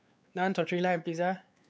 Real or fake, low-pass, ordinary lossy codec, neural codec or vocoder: fake; none; none; codec, 16 kHz, 4 kbps, X-Codec, WavLM features, trained on Multilingual LibriSpeech